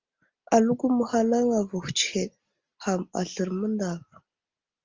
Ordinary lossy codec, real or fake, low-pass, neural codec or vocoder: Opus, 32 kbps; real; 7.2 kHz; none